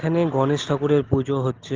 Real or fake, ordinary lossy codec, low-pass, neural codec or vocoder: real; Opus, 16 kbps; 7.2 kHz; none